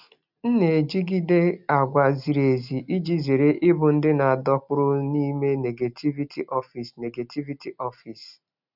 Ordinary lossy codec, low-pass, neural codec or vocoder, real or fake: none; 5.4 kHz; none; real